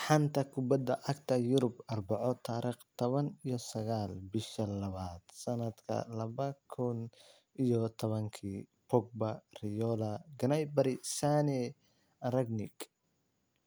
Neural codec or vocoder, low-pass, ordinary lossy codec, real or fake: none; none; none; real